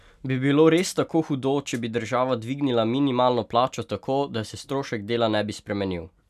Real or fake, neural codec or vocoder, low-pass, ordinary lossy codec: real; none; 14.4 kHz; none